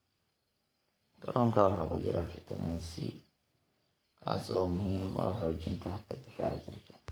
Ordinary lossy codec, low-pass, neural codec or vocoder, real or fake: none; none; codec, 44.1 kHz, 3.4 kbps, Pupu-Codec; fake